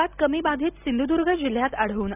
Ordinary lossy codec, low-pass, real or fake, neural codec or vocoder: none; 3.6 kHz; real; none